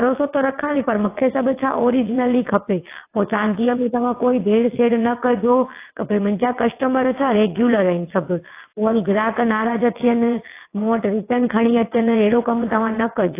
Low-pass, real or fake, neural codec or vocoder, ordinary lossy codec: 3.6 kHz; fake; vocoder, 22.05 kHz, 80 mel bands, WaveNeXt; AAC, 24 kbps